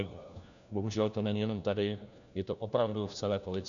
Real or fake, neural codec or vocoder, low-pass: fake; codec, 16 kHz, 1 kbps, FunCodec, trained on LibriTTS, 50 frames a second; 7.2 kHz